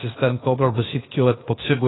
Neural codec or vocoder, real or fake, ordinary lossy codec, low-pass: codec, 16 kHz, 0.8 kbps, ZipCodec; fake; AAC, 16 kbps; 7.2 kHz